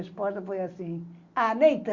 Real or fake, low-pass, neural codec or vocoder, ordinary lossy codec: real; 7.2 kHz; none; Opus, 64 kbps